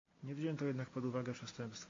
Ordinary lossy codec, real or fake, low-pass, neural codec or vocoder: AAC, 32 kbps; real; 7.2 kHz; none